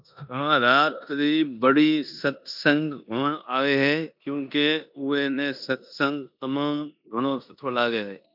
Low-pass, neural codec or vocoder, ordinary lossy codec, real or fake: 5.4 kHz; codec, 16 kHz in and 24 kHz out, 0.9 kbps, LongCat-Audio-Codec, four codebook decoder; MP3, 48 kbps; fake